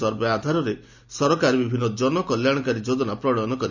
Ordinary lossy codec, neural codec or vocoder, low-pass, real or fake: none; none; 7.2 kHz; real